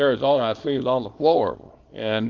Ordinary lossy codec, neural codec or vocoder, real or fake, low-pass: Opus, 24 kbps; codec, 24 kHz, 0.9 kbps, WavTokenizer, small release; fake; 7.2 kHz